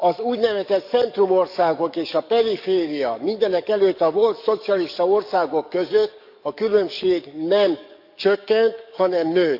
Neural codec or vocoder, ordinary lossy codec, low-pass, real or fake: codec, 44.1 kHz, 7.8 kbps, DAC; none; 5.4 kHz; fake